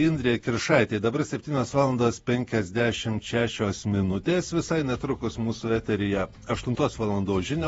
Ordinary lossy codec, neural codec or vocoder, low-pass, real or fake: AAC, 24 kbps; vocoder, 48 kHz, 128 mel bands, Vocos; 19.8 kHz; fake